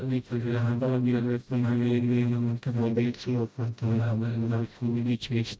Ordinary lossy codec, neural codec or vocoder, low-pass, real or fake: none; codec, 16 kHz, 0.5 kbps, FreqCodec, smaller model; none; fake